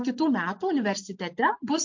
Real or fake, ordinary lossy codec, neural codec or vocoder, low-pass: real; MP3, 48 kbps; none; 7.2 kHz